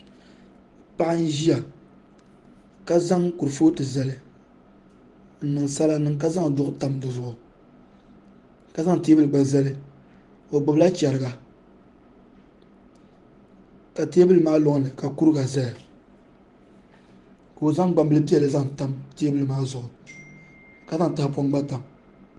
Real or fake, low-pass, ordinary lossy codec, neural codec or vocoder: fake; 10.8 kHz; Opus, 24 kbps; vocoder, 44.1 kHz, 128 mel bands every 512 samples, BigVGAN v2